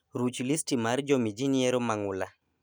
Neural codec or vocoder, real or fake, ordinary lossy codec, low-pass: none; real; none; none